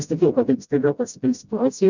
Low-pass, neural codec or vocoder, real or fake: 7.2 kHz; codec, 16 kHz, 0.5 kbps, FreqCodec, smaller model; fake